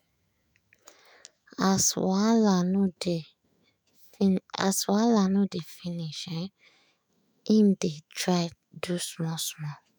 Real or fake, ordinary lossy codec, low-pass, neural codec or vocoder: fake; none; none; autoencoder, 48 kHz, 128 numbers a frame, DAC-VAE, trained on Japanese speech